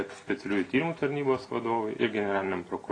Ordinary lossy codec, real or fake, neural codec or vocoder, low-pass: AAC, 32 kbps; real; none; 9.9 kHz